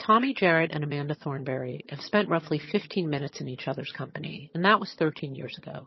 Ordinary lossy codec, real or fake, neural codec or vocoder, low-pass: MP3, 24 kbps; fake; vocoder, 22.05 kHz, 80 mel bands, HiFi-GAN; 7.2 kHz